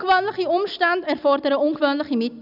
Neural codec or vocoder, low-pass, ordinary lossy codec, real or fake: none; 5.4 kHz; none; real